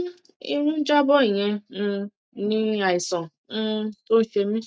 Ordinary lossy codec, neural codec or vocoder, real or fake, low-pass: none; none; real; none